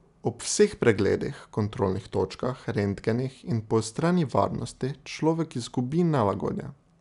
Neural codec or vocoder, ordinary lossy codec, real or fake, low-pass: none; none; real; 10.8 kHz